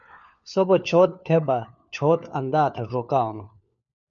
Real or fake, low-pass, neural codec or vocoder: fake; 7.2 kHz; codec, 16 kHz, 4 kbps, FunCodec, trained on LibriTTS, 50 frames a second